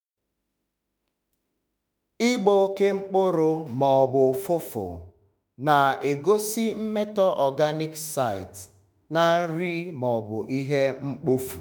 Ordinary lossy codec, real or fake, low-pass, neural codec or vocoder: none; fake; none; autoencoder, 48 kHz, 32 numbers a frame, DAC-VAE, trained on Japanese speech